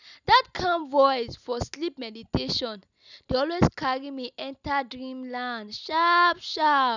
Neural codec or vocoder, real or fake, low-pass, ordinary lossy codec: none; real; 7.2 kHz; none